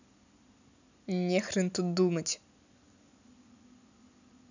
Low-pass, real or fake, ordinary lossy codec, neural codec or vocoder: 7.2 kHz; real; none; none